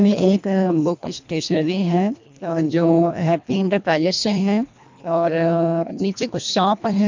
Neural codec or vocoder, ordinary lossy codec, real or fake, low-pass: codec, 24 kHz, 1.5 kbps, HILCodec; MP3, 64 kbps; fake; 7.2 kHz